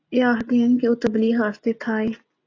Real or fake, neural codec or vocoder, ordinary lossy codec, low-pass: real; none; AAC, 48 kbps; 7.2 kHz